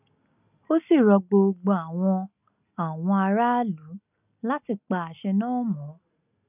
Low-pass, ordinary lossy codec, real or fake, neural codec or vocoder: 3.6 kHz; none; real; none